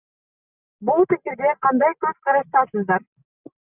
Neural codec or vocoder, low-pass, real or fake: none; 3.6 kHz; real